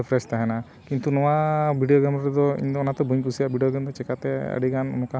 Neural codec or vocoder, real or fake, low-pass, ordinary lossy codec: none; real; none; none